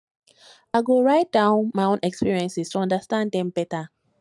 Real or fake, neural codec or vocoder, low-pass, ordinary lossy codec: real; none; 10.8 kHz; none